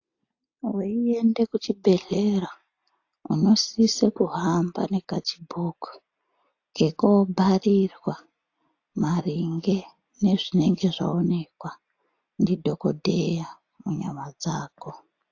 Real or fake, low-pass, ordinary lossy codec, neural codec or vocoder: real; 7.2 kHz; AAC, 48 kbps; none